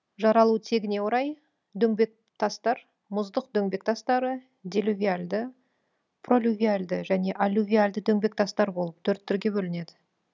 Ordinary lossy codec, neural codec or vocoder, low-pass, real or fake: none; none; 7.2 kHz; real